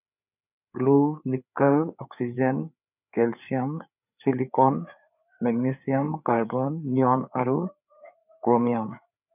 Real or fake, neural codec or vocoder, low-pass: fake; codec, 16 kHz, 4 kbps, FreqCodec, larger model; 3.6 kHz